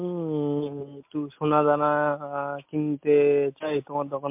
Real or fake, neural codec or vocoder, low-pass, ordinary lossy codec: real; none; 3.6 kHz; MP3, 32 kbps